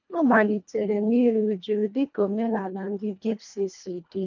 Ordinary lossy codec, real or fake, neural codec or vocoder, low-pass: none; fake; codec, 24 kHz, 1.5 kbps, HILCodec; 7.2 kHz